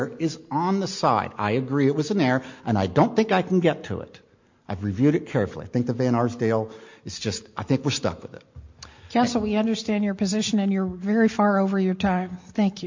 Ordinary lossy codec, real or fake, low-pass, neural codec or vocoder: MP3, 48 kbps; real; 7.2 kHz; none